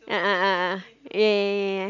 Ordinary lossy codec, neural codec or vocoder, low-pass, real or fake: none; none; 7.2 kHz; real